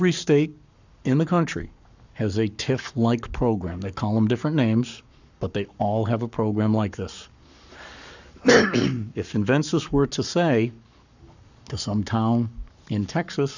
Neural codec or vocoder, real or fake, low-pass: codec, 44.1 kHz, 7.8 kbps, Pupu-Codec; fake; 7.2 kHz